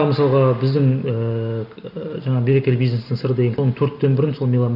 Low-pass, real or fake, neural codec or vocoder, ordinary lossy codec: 5.4 kHz; real; none; none